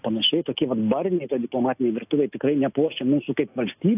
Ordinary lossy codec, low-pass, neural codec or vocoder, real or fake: AAC, 32 kbps; 3.6 kHz; none; real